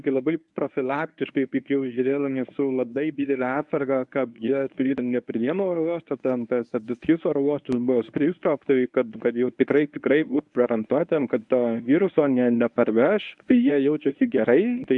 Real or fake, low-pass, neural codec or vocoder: fake; 10.8 kHz; codec, 24 kHz, 0.9 kbps, WavTokenizer, medium speech release version 1